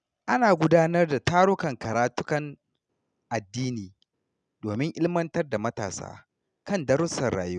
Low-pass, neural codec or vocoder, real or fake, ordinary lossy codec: 9.9 kHz; none; real; none